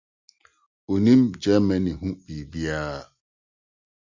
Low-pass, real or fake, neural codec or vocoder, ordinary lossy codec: 7.2 kHz; real; none; Opus, 64 kbps